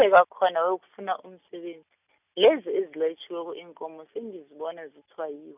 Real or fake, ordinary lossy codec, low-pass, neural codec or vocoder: real; none; 3.6 kHz; none